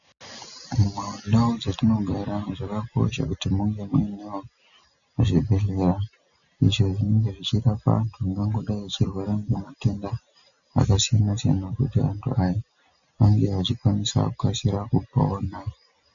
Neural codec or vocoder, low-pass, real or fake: none; 7.2 kHz; real